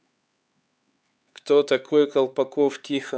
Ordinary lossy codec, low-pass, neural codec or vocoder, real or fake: none; none; codec, 16 kHz, 4 kbps, X-Codec, HuBERT features, trained on LibriSpeech; fake